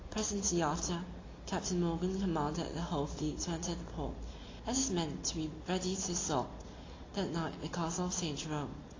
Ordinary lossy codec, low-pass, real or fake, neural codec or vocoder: AAC, 32 kbps; 7.2 kHz; real; none